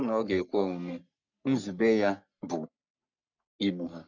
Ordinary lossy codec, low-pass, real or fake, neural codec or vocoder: none; 7.2 kHz; fake; codec, 44.1 kHz, 3.4 kbps, Pupu-Codec